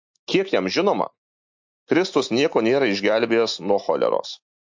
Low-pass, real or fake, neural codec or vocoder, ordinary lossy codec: 7.2 kHz; real; none; MP3, 48 kbps